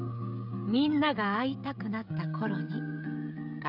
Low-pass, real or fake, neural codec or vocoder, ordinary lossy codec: 5.4 kHz; fake; codec, 16 kHz, 16 kbps, FreqCodec, smaller model; none